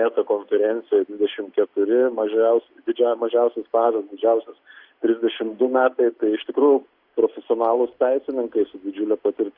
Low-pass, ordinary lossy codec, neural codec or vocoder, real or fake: 5.4 kHz; Opus, 64 kbps; none; real